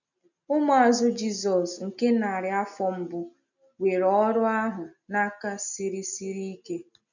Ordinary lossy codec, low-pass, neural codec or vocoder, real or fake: none; 7.2 kHz; none; real